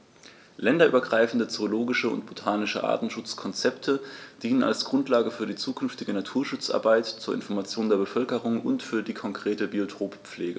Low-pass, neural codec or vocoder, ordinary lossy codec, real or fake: none; none; none; real